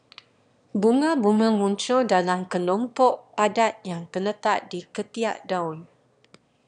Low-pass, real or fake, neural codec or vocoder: 9.9 kHz; fake; autoencoder, 22.05 kHz, a latent of 192 numbers a frame, VITS, trained on one speaker